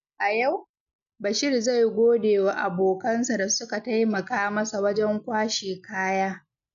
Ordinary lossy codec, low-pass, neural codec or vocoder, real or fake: MP3, 96 kbps; 7.2 kHz; none; real